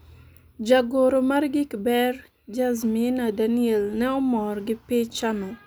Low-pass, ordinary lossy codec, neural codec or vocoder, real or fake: none; none; none; real